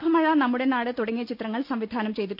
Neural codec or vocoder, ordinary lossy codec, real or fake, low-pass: none; none; real; 5.4 kHz